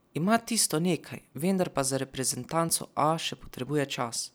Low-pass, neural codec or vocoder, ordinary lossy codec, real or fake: none; none; none; real